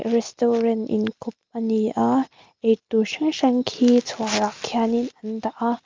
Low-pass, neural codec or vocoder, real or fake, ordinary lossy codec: 7.2 kHz; none; real; Opus, 32 kbps